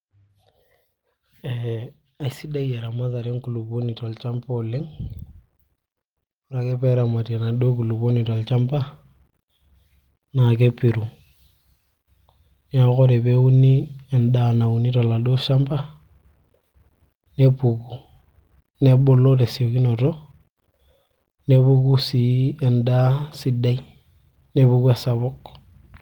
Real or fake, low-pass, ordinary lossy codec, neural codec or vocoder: real; 19.8 kHz; Opus, 24 kbps; none